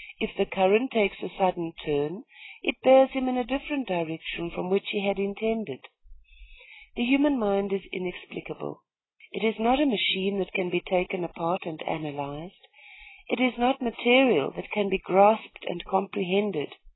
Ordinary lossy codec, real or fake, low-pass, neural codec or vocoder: AAC, 16 kbps; real; 7.2 kHz; none